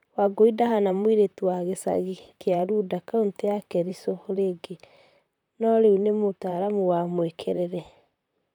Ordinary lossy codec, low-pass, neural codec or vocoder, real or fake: none; 19.8 kHz; vocoder, 44.1 kHz, 128 mel bands, Pupu-Vocoder; fake